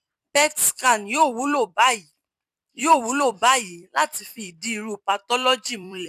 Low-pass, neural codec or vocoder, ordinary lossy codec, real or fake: 14.4 kHz; vocoder, 44.1 kHz, 128 mel bands every 256 samples, BigVGAN v2; none; fake